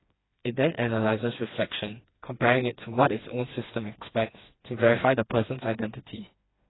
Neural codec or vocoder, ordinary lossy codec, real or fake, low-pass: codec, 16 kHz, 2 kbps, FreqCodec, smaller model; AAC, 16 kbps; fake; 7.2 kHz